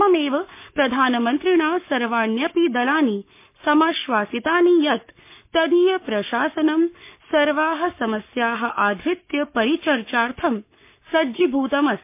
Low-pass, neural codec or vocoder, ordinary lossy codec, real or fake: 3.6 kHz; codec, 44.1 kHz, 7.8 kbps, Pupu-Codec; MP3, 24 kbps; fake